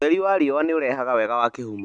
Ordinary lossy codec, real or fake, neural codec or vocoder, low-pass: none; real; none; 9.9 kHz